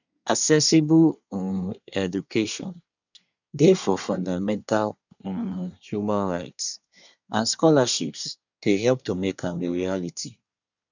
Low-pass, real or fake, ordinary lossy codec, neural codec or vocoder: 7.2 kHz; fake; none; codec, 24 kHz, 1 kbps, SNAC